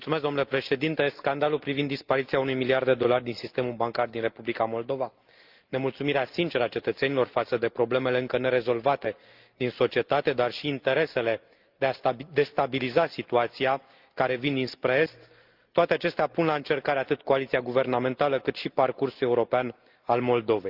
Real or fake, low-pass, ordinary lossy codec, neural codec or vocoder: real; 5.4 kHz; Opus, 32 kbps; none